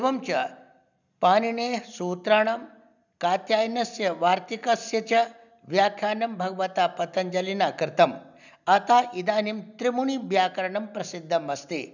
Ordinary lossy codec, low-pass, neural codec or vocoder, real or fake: none; 7.2 kHz; none; real